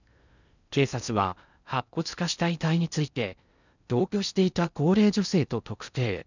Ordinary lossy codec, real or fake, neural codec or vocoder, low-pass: none; fake; codec, 16 kHz in and 24 kHz out, 0.6 kbps, FocalCodec, streaming, 4096 codes; 7.2 kHz